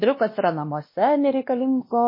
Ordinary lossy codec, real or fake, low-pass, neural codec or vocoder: MP3, 24 kbps; fake; 5.4 kHz; codec, 16 kHz, 1 kbps, X-Codec, WavLM features, trained on Multilingual LibriSpeech